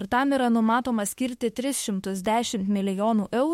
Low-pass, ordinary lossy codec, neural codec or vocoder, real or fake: 19.8 kHz; MP3, 64 kbps; autoencoder, 48 kHz, 32 numbers a frame, DAC-VAE, trained on Japanese speech; fake